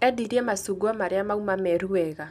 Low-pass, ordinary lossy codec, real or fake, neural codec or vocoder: 14.4 kHz; none; real; none